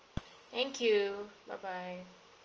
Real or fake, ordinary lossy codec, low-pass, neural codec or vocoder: real; Opus, 24 kbps; 7.2 kHz; none